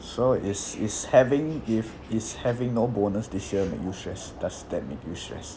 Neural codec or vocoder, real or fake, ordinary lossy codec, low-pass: none; real; none; none